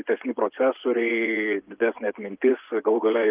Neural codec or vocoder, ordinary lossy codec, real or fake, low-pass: vocoder, 24 kHz, 100 mel bands, Vocos; Opus, 32 kbps; fake; 3.6 kHz